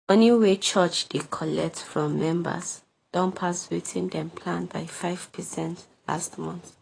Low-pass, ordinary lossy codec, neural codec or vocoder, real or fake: 9.9 kHz; AAC, 32 kbps; vocoder, 24 kHz, 100 mel bands, Vocos; fake